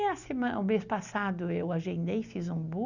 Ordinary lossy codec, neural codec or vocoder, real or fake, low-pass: none; none; real; 7.2 kHz